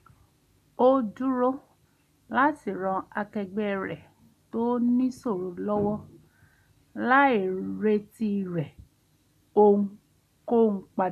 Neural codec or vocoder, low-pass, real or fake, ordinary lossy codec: none; 14.4 kHz; real; none